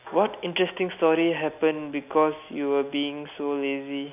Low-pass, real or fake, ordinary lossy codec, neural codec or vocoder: 3.6 kHz; real; none; none